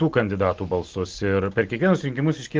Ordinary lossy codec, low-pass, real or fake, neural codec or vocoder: Opus, 16 kbps; 7.2 kHz; real; none